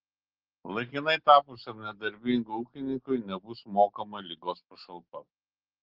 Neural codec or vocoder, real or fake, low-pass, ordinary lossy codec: none; real; 5.4 kHz; Opus, 16 kbps